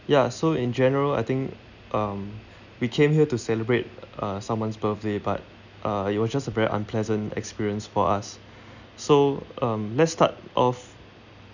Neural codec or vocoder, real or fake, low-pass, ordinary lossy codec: none; real; 7.2 kHz; none